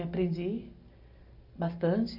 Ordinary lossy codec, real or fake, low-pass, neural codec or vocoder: AAC, 48 kbps; real; 5.4 kHz; none